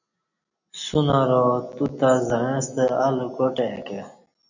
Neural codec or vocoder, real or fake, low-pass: none; real; 7.2 kHz